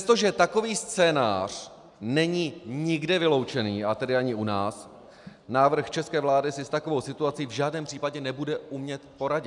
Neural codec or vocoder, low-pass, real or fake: none; 10.8 kHz; real